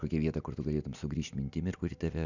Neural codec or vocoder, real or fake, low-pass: none; real; 7.2 kHz